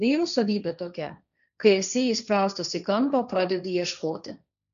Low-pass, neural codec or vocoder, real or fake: 7.2 kHz; codec, 16 kHz, 1.1 kbps, Voila-Tokenizer; fake